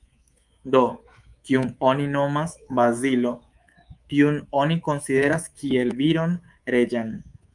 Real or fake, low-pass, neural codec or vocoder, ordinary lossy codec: fake; 10.8 kHz; codec, 24 kHz, 3.1 kbps, DualCodec; Opus, 32 kbps